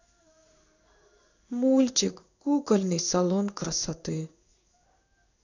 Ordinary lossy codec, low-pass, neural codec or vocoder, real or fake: none; 7.2 kHz; codec, 16 kHz in and 24 kHz out, 1 kbps, XY-Tokenizer; fake